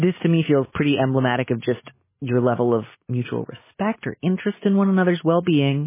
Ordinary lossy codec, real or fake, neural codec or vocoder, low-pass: MP3, 16 kbps; real; none; 3.6 kHz